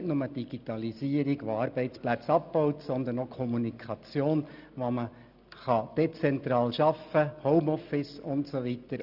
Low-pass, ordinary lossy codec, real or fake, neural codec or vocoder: 5.4 kHz; none; real; none